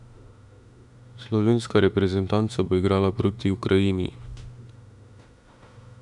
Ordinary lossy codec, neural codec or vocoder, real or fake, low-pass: none; autoencoder, 48 kHz, 32 numbers a frame, DAC-VAE, trained on Japanese speech; fake; 10.8 kHz